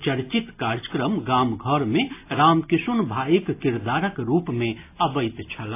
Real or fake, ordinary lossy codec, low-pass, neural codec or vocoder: real; AAC, 24 kbps; 3.6 kHz; none